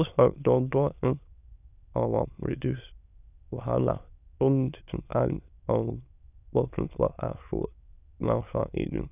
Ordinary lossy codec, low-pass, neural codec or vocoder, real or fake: none; 3.6 kHz; autoencoder, 22.05 kHz, a latent of 192 numbers a frame, VITS, trained on many speakers; fake